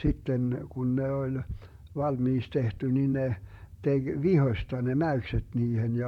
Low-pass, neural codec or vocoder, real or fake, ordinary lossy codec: 19.8 kHz; vocoder, 44.1 kHz, 128 mel bands, Pupu-Vocoder; fake; MP3, 96 kbps